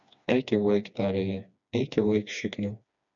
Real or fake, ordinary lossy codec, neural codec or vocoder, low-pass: fake; AAC, 64 kbps; codec, 16 kHz, 2 kbps, FreqCodec, smaller model; 7.2 kHz